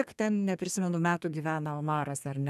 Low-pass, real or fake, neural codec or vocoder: 14.4 kHz; fake; codec, 32 kHz, 1.9 kbps, SNAC